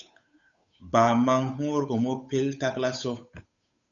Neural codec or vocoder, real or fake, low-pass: codec, 16 kHz, 8 kbps, FunCodec, trained on Chinese and English, 25 frames a second; fake; 7.2 kHz